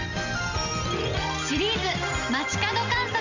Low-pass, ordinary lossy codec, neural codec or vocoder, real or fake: 7.2 kHz; none; none; real